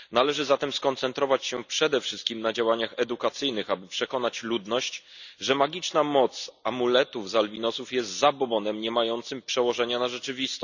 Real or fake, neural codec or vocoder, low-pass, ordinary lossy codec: real; none; 7.2 kHz; none